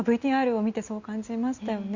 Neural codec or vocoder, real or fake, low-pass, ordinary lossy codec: none; real; 7.2 kHz; Opus, 64 kbps